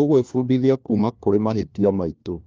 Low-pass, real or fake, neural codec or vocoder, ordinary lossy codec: 7.2 kHz; fake; codec, 16 kHz, 1 kbps, FunCodec, trained on LibriTTS, 50 frames a second; Opus, 24 kbps